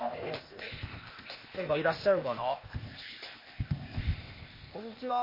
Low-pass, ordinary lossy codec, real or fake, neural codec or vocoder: 5.4 kHz; MP3, 24 kbps; fake; codec, 16 kHz, 0.8 kbps, ZipCodec